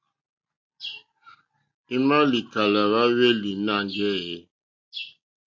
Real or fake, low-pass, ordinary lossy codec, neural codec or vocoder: real; 7.2 kHz; MP3, 48 kbps; none